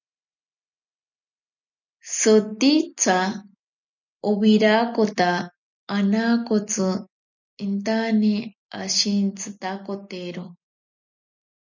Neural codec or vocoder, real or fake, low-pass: none; real; 7.2 kHz